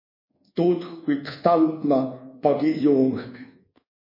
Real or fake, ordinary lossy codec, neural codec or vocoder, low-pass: fake; MP3, 24 kbps; codec, 24 kHz, 1.2 kbps, DualCodec; 5.4 kHz